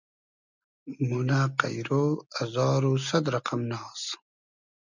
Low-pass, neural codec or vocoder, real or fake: 7.2 kHz; none; real